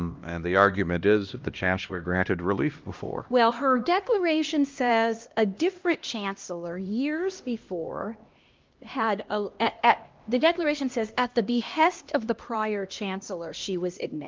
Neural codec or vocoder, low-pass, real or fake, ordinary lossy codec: codec, 16 kHz, 1 kbps, X-Codec, HuBERT features, trained on LibriSpeech; 7.2 kHz; fake; Opus, 32 kbps